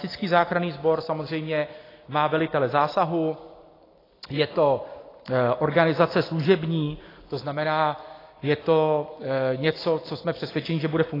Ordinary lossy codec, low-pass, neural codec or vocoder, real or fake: AAC, 24 kbps; 5.4 kHz; none; real